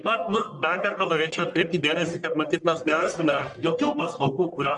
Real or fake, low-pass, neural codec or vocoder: fake; 10.8 kHz; codec, 44.1 kHz, 1.7 kbps, Pupu-Codec